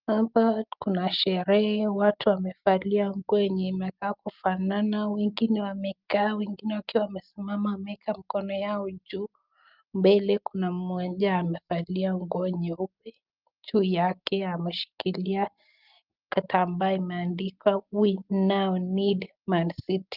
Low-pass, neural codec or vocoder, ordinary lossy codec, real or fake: 5.4 kHz; none; Opus, 24 kbps; real